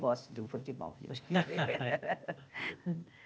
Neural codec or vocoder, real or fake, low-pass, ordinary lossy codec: codec, 16 kHz, 0.8 kbps, ZipCodec; fake; none; none